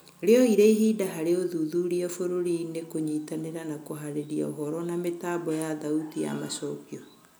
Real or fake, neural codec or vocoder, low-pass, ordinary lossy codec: real; none; none; none